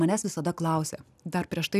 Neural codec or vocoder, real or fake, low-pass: none; real; 14.4 kHz